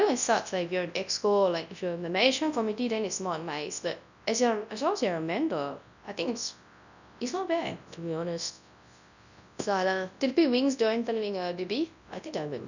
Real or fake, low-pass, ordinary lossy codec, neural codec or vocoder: fake; 7.2 kHz; none; codec, 24 kHz, 0.9 kbps, WavTokenizer, large speech release